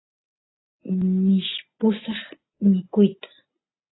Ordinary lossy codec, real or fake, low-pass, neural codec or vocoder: AAC, 16 kbps; real; 7.2 kHz; none